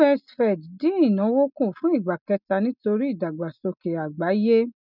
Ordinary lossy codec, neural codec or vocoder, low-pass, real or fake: none; none; 5.4 kHz; real